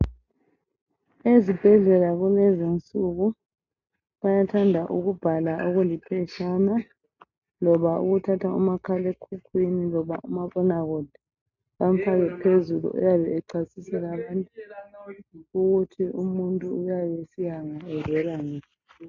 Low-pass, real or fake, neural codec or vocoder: 7.2 kHz; real; none